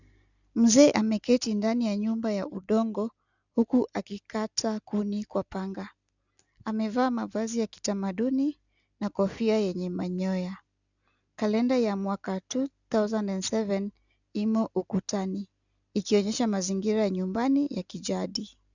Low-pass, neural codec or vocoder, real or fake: 7.2 kHz; none; real